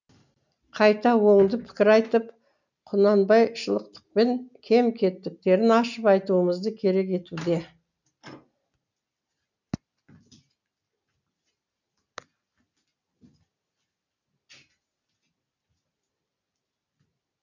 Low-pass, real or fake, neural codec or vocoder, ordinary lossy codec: 7.2 kHz; real; none; none